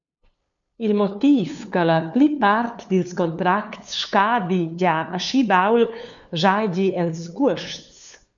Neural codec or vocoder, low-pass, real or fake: codec, 16 kHz, 2 kbps, FunCodec, trained on LibriTTS, 25 frames a second; 7.2 kHz; fake